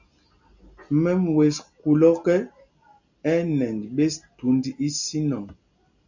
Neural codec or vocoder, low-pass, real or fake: none; 7.2 kHz; real